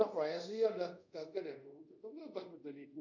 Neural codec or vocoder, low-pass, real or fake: codec, 24 kHz, 0.5 kbps, DualCodec; 7.2 kHz; fake